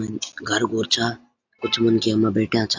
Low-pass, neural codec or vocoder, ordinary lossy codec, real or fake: 7.2 kHz; none; AAC, 48 kbps; real